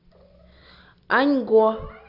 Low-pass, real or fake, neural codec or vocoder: 5.4 kHz; real; none